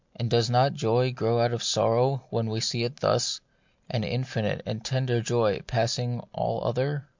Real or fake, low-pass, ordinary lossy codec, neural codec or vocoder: real; 7.2 kHz; MP3, 64 kbps; none